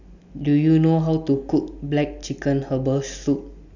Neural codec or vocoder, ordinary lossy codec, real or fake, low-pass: none; none; real; 7.2 kHz